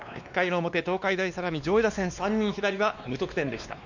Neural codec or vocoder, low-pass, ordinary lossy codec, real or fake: codec, 16 kHz, 2 kbps, X-Codec, WavLM features, trained on Multilingual LibriSpeech; 7.2 kHz; none; fake